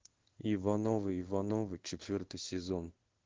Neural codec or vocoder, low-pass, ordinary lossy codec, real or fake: codec, 16 kHz in and 24 kHz out, 1 kbps, XY-Tokenizer; 7.2 kHz; Opus, 16 kbps; fake